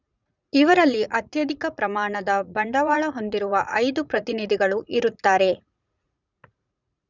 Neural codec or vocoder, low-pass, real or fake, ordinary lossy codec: vocoder, 44.1 kHz, 80 mel bands, Vocos; 7.2 kHz; fake; none